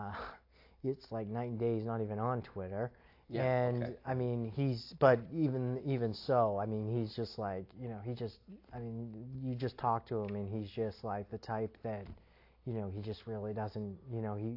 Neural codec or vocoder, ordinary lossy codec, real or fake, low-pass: none; AAC, 32 kbps; real; 5.4 kHz